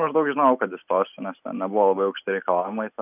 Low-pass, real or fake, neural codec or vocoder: 3.6 kHz; real; none